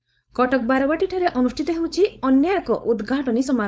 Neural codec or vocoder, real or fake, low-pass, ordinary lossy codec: codec, 16 kHz, 4.8 kbps, FACodec; fake; none; none